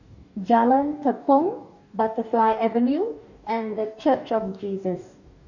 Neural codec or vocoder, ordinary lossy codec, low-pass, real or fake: codec, 44.1 kHz, 2.6 kbps, DAC; none; 7.2 kHz; fake